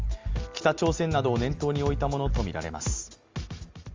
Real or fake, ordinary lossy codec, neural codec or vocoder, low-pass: real; Opus, 32 kbps; none; 7.2 kHz